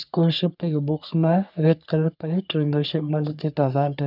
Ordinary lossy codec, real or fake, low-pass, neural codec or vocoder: none; fake; 5.4 kHz; codec, 44.1 kHz, 3.4 kbps, Pupu-Codec